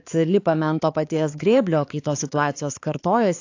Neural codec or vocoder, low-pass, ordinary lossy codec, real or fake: codec, 16 kHz, 4 kbps, X-Codec, HuBERT features, trained on balanced general audio; 7.2 kHz; AAC, 48 kbps; fake